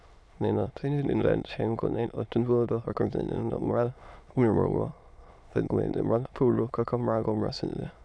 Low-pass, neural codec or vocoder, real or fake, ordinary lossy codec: none; autoencoder, 22.05 kHz, a latent of 192 numbers a frame, VITS, trained on many speakers; fake; none